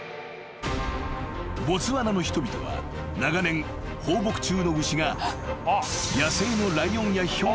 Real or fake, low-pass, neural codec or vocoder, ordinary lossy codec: real; none; none; none